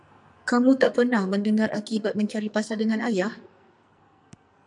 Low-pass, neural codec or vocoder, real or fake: 10.8 kHz; codec, 44.1 kHz, 2.6 kbps, SNAC; fake